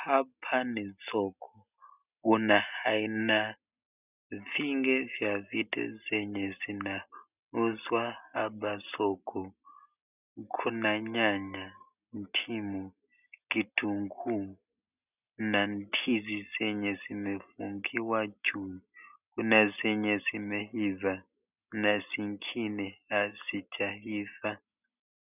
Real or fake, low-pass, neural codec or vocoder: real; 3.6 kHz; none